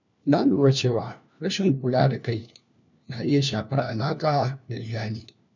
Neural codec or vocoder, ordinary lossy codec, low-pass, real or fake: codec, 16 kHz, 1 kbps, FunCodec, trained on LibriTTS, 50 frames a second; none; 7.2 kHz; fake